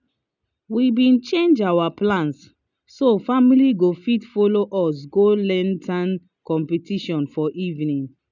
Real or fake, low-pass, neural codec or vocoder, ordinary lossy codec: real; 7.2 kHz; none; none